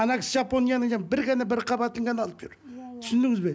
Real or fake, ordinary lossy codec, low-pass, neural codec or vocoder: real; none; none; none